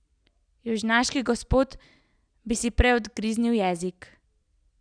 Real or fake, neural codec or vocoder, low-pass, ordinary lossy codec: real; none; 9.9 kHz; none